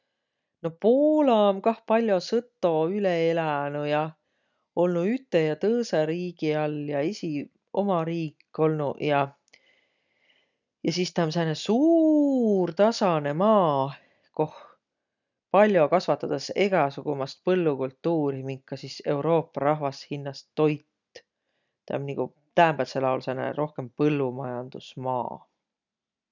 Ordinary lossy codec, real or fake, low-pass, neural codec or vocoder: none; real; 7.2 kHz; none